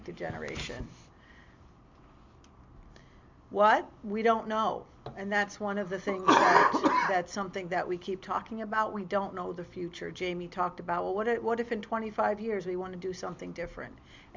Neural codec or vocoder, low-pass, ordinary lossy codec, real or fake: none; 7.2 kHz; MP3, 64 kbps; real